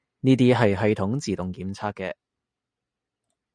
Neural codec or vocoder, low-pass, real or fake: none; 9.9 kHz; real